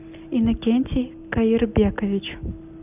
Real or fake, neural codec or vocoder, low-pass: real; none; 3.6 kHz